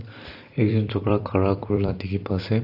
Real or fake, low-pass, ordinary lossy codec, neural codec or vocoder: fake; 5.4 kHz; AAC, 32 kbps; vocoder, 44.1 kHz, 128 mel bands every 256 samples, BigVGAN v2